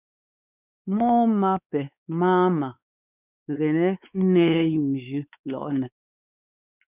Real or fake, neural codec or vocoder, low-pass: fake; codec, 16 kHz, 4 kbps, X-Codec, WavLM features, trained on Multilingual LibriSpeech; 3.6 kHz